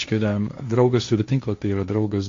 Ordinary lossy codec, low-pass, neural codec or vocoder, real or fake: MP3, 48 kbps; 7.2 kHz; codec, 16 kHz, 1.1 kbps, Voila-Tokenizer; fake